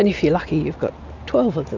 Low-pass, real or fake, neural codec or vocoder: 7.2 kHz; real; none